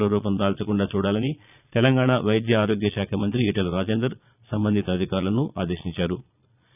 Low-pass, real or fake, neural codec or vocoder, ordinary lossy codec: 3.6 kHz; fake; vocoder, 22.05 kHz, 80 mel bands, Vocos; none